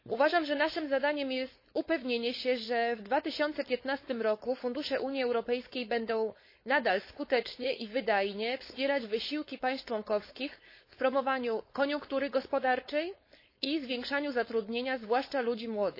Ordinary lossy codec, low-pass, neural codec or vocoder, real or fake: MP3, 24 kbps; 5.4 kHz; codec, 16 kHz, 4.8 kbps, FACodec; fake